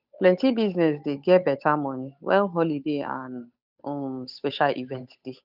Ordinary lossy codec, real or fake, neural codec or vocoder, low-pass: none; fake; codec, 16 kHz, 8 kbps, FunCodec, trained on Chinese and English, 25 frames a second; 5.4 kHz